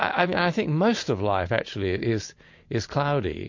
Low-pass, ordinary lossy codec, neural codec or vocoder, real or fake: 7.2 kHz; MP3, 48 kbps; none; real